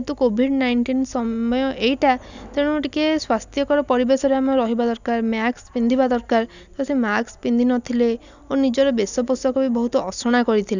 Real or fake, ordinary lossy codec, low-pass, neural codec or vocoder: real; none; 7.2 kHz; none